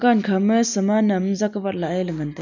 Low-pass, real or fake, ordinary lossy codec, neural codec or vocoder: 7.2 kHz; real; none; none